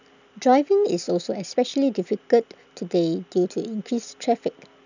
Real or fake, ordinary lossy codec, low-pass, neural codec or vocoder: real; none; 7.2 kHz; none